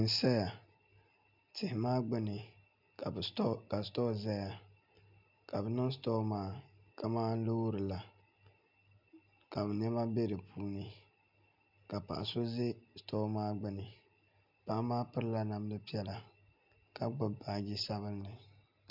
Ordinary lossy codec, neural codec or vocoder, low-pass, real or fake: MP3, 48 kbps; none; 5.4 kHz; real